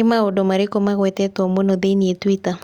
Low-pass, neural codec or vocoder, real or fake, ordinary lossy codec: 19.8 kHz; none; real; Opus, 64 kbps